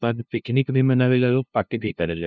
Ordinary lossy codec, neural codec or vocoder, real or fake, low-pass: none; codec, 16 kHz, 0.5 kbps, FunCodec, trained on LibriTTS, 25 frames a second; fake; none